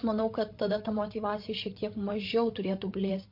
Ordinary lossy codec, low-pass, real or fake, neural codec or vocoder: MP3, 32 kbps; 5.4 kHz; fake; codec, 16 kHz, 8 kbps, FreqCodec, larger model